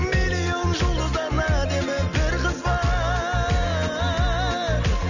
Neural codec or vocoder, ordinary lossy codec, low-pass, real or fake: none; none; 7.2 kHz; real